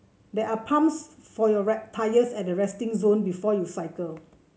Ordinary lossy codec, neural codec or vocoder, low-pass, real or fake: none; none; none; real